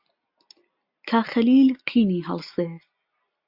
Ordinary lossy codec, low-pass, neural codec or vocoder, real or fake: AAC, 48 kbps; 5.4 kHz; none; real